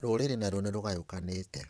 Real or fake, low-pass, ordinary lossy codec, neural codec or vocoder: fake; none; none; vocoder, 22.05 kHz, 80 mel bands, WaveNeXt